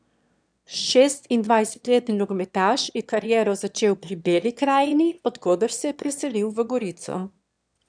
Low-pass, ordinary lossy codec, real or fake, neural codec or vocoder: 9.9 kHz; none; fake; autoencoder, 22.05 kHz, a latent of 192 numbers a frame, VITS, trained on one speaker